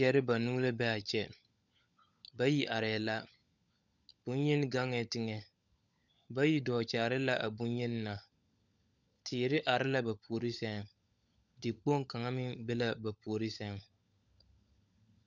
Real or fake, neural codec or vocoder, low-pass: fake; codec, 16 kHz, 16 kbps, FunCodec, trained on LibriTTS, 50 frames a second; 7.2 kHz